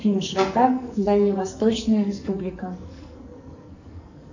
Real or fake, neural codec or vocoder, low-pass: fake; codec, 44.1 kHz, 2.6 kbps, SNAC; 7.2 kHz